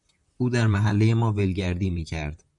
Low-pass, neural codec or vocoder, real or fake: 10.8 kHz; vocoder, 44.1 kHz, 128 mel bands, Pupu-Vocoder; fake